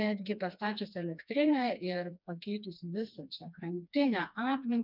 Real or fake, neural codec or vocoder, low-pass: fake; codec, 16 kHz, 2 kbps, FreqCodec, smaller model; 5.4 kHz